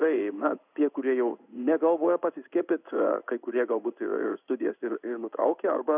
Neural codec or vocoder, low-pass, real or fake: codec, 16 kHz in and 24 kHz out, 1 kbps, XY-Tokenizer; 3.6 kHz; fake